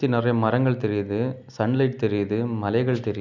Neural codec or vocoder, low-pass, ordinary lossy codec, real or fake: none; 7.2 kHz; none; real